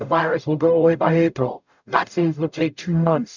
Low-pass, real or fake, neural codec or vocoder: 7.2 kHz; fake; codec, 44.1 kHz, 0.9 kbps, DAC